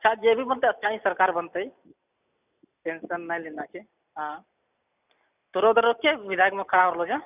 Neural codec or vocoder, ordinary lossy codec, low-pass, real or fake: none; none; 3.6 kHz; real